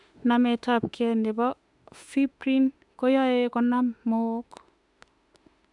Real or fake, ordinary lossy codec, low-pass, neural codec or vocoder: fake; none; 10.8 kHz; autoencoder, 48 kHz, 32 numbers a frame, DAC-VAE, trained on Japanese speech